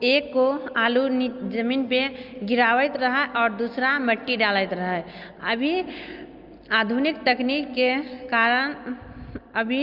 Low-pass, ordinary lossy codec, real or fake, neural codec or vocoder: 5.4 kHz; Opus, 24 kbps; real; none